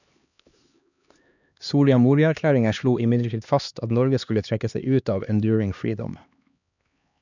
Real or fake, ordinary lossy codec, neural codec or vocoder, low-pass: fake; none; codec, 16 kHz, 2 kbps, X-Codec, HuBERT features, trained on LibriSpeech; 7.2 kHz